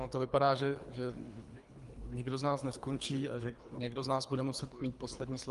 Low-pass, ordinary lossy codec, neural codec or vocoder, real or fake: 10.8 kHz; Opus, 32 kbps; codec, 24 kHz, 3 kbps, HILCodec; fake